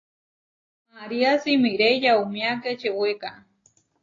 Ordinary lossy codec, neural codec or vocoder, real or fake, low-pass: AAC, 32 kbps; none; real; 7.2 kHz